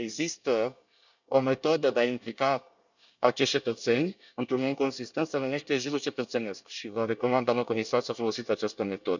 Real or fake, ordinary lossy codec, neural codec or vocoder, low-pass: fake; none; codec, 24 kHz, 1 kbps, SNAC; 7.2 kHz